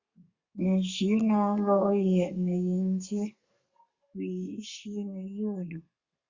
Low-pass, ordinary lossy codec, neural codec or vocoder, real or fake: 7.2 kHz; Opus, 64 kbps; codec, 32 kHz, 1.9 kbps, SNAC; fake